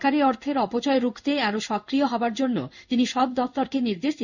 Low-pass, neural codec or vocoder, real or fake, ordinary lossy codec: 7.2 kHz; codec, 16 kHz in and 24 kHz out, 1 kbps, XY-Tokenizer; fake; none